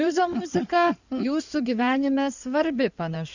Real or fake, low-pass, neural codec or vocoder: fake; 7.2 kHz; codec, 16 kHz in and 24 kHz out, 2.2 kbps, FireRedTTS-2 codec